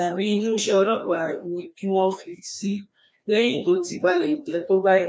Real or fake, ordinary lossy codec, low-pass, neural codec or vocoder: fake; none; none; codec, 16 kHz, 1 kbps, FreqCodec, larger model